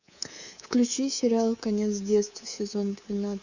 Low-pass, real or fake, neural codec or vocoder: 7.2 kHz; fake; codec, 24 kHz, 3.1 kbps, DualCodec